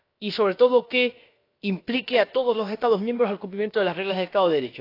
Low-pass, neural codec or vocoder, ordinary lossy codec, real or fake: 5.4 kHz; codec, 16 kHz, about 1 kbps, DyCAST, with the encoder's durations; AAC, 32 kbps; fake